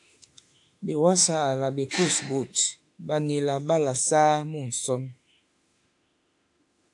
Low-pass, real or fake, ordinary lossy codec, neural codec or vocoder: 10.8 kHz; fake; AAC, 64 kbps; autoencoder, 48 kHz, 32 numbers a frame, DAC-VAE, trained on Japanese speech